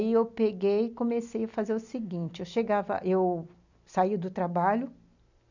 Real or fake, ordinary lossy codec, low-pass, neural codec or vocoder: real; none; 7.2 kHz; none